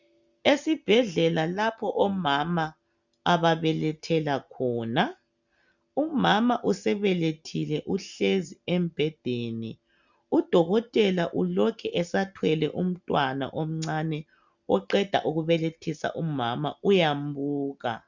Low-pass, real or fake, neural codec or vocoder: 7.2 kHz; real; none